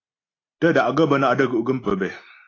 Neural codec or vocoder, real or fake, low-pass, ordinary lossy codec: none; real; 7.2 kHz; AAC, 32 kbps